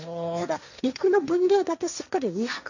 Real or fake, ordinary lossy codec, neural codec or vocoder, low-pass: fake; none; codec, 16 kHz, 1.1 kbps, Voila-Tokenizer; 7.2 kHz